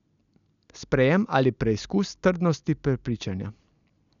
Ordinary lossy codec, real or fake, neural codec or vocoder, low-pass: none; real; none; 7.2 kHz